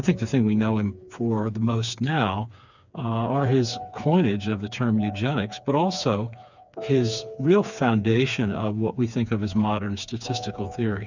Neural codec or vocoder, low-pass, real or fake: codec, 16 kHz, 4 kbps, FreqCodec, smaller model; 7.2 kHz; fake